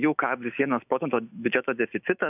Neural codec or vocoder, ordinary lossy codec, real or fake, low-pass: autoencoder, 48 kHz, 128 numbers a frame, DAC-VAE, trained on Japanese speech; AAC, 32 kbps; fake; 3.6 kHz